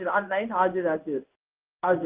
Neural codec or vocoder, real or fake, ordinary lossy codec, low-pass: codec, 16 kHz in and 24 kHz out, 1 kbps, XY-Tokenizer; fake; Opus, 32 kbps; 3.6 kHz